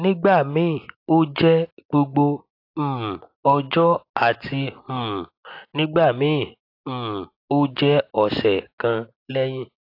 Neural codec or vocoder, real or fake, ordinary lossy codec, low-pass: none; real; AAC, 32 kbps; 5.4 kHz